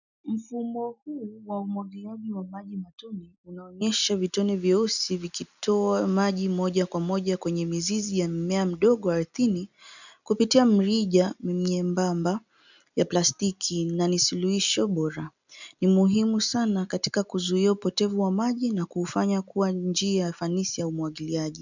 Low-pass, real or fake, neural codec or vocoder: 7.2 kHz; real; none